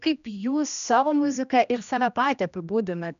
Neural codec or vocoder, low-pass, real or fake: codec, 16 kHz, 1 kbps, X-Codec, HuBERT features, trained on general audio; 7.2 kHz; fake